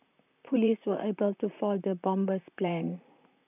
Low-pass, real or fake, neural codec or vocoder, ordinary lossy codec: 3.6 kHz; fake; vocoder, 44.1 kHz, 128 mel bands, Pupu-Vocoder; none